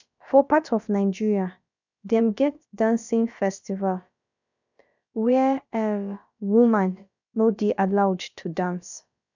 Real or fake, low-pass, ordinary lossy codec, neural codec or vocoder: fake; 7.2 kHz; none; codec, 16 kHz, about 1 kbps, DyCAST, with the encoder's durations